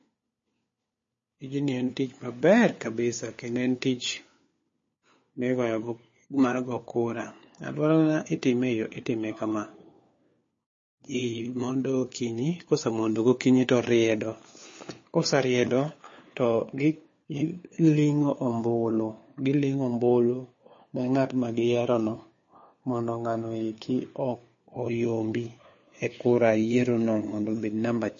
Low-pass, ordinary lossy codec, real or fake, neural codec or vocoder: 7.2 kHz; MP3, 32 kbps; fake; codec, 16 kHz, 4 kbps, FunCodec, trained on LibriTTS, 50 frames a second